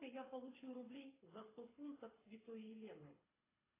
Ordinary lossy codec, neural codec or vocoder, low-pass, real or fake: AAC, 16 kbps; codec, 24 kHz, 6 kbps, HILCodec; 3.6 kHz; fake